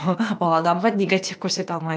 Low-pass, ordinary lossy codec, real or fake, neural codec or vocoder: none; none; fake; codec, 16 kHz, 0.8 kbps, ZipCodec